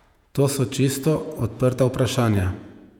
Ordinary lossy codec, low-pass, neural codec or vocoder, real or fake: none; 19.8 kHz; vocoder, 48 kHz, 128 mel bands, Vocos; fake